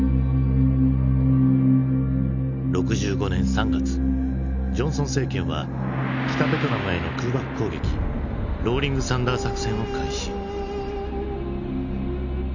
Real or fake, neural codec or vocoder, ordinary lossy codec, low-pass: real; none; none; 7.2 kHz